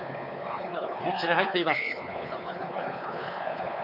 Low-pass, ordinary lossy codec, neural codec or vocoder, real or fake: 5.4 kHz; none; codec, 16 kHz, 4 kbps, X-Codec, WavLM features, trained on Multilingual LibriSpeech; fake